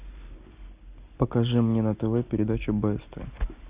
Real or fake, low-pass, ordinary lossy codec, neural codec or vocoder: real; 3.6 kHz; Opus, 64 kbps; none